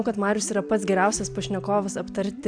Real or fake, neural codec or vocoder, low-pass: real; none; 9.9 kHz